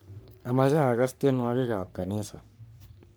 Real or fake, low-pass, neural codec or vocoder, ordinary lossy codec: fake; none; codec, 44.1 kHz, 3.4 kbps, Pupu-Codec; none